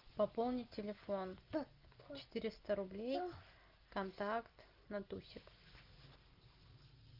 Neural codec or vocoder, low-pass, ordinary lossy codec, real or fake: none; 5.4 kHz; Opus, 24 kbps; real